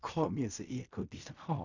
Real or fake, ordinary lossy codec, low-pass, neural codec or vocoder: fake; none; 7.2 kHz; codec, 16 kHz in and 24 kHz out, 0.4 kbps, LongCat-Audio-Codec, fine tuned four codebook decoder